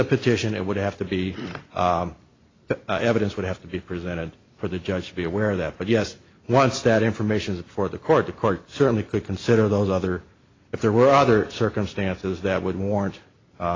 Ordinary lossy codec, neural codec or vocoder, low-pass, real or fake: AAC, 32 kbps; none; 7.2 kHz; real